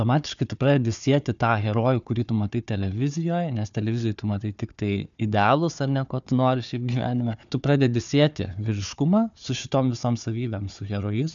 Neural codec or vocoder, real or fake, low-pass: codec, 16 kHz, 4 kbps, FunCodec, trained on Chinese and English, 50 frames a second; fake; 7.2 kHz